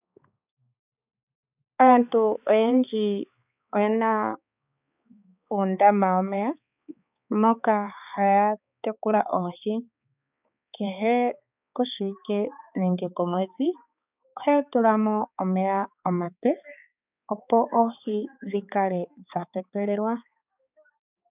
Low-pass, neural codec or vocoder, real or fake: 3.6 kHz; codec, 16 kHz, 4 kbps, X-Codec, HuBERT features, trained on balanced general audio; fake